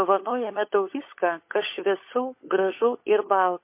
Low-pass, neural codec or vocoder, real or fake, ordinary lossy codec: 3.6 kHz; codec, 16 kHz, 16 kbps, FunCodec, trained on LibriTTS, 50 frames a second; fake; MP3, 24 kbps